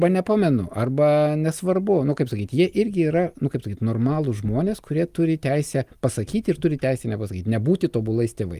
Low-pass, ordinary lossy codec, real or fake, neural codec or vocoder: 14.4 kHz; Opus, 32 kbps; real; none